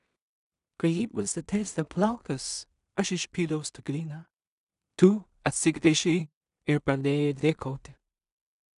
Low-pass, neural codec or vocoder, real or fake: 10.8 kHz; codec, 16 kHz in and 24 kHz out, 0.4 kbps, LongCat-Audio-Codec, two codebook decoder; fake